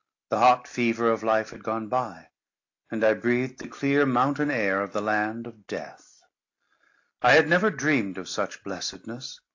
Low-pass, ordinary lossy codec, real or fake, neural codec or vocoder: 7.2 kHz; AAC, 48 kbps; real; none